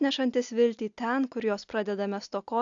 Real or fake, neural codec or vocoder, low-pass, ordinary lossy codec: real; none; 7.2 kHz; AAC, 64 kbps